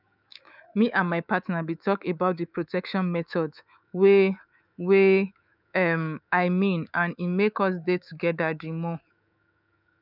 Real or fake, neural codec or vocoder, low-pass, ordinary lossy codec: fake; codec, 24 kHz, 3.1 kbps, DualCodec; 5.4 kHz; none